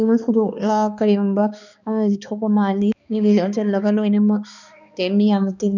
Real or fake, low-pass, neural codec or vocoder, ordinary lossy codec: fake; 7.2 kHz; codec, 16 kHz, 2 kbps, X-Codec, HuBERT features, trained on balanced general audio; none